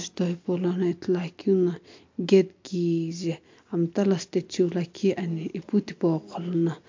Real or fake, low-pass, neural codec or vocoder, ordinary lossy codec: real; 7.2 kHz; none; MP3, 48 kbps